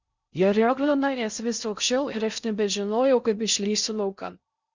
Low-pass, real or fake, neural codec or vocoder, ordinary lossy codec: 7.2 kHz; fake; codec, 16 kHz in and 24 kHz out, 0.6 kbps, FocalCodec, streaming, 2048 codes; Opus, 64 kbps